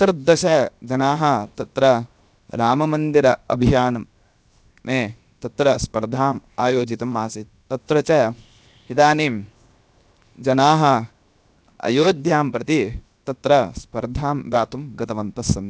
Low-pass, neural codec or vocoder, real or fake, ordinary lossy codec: none; codec, 16 kHz, 0.7 kbps, FocalCodec; fake; none